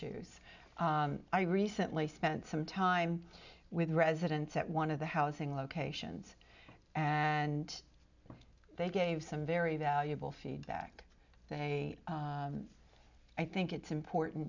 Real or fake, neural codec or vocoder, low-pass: real; none; 7.2 kHz